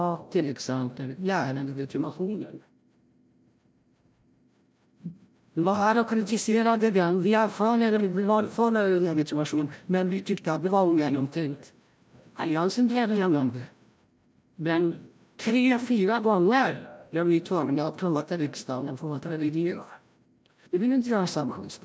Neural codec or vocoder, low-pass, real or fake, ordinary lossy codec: codec, 16 kHz, 0.5 kbps, FreqCodec, larger model; none; fake; none